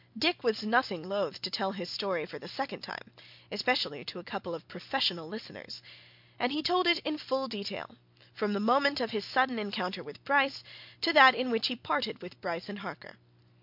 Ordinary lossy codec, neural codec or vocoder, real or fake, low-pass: MP3, 48 kbps; none; real; 5.4 kHz